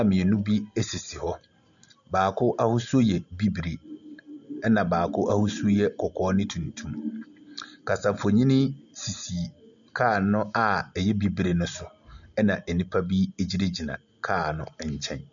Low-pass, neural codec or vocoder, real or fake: 7.2 kHz; none; real